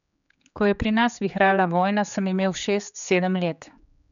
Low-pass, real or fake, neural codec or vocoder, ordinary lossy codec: 7.2 kHz; fake; codec, 16 kHz, 4 kbps, X-Codec, HuBERT features, trained on general audio; none